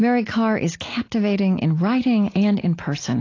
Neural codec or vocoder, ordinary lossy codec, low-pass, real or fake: none; AAC, 32 kbps; 7.2 kHz; real